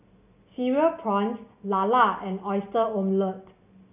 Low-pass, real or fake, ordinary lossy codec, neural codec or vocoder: 3.6 kHz; real; none; none